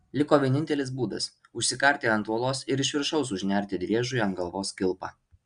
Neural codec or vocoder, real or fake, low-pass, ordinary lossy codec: none; real; 9.9 kHz; Opus, 64 kbps